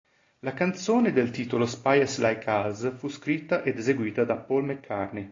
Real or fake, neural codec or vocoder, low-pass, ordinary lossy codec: real; none; 7.2 kHz; AAC, 32 kbps